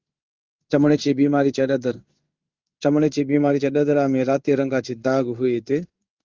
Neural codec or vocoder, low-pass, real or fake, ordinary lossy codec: codec, 16 kHz in and 24 kHz out, 1 kbps, XY-Tokenizer; 7.2 kHz; fake; Opus, 24 kbps